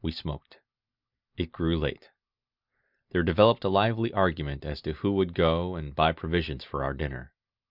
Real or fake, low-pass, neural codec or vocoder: real; 5.4 kHz; none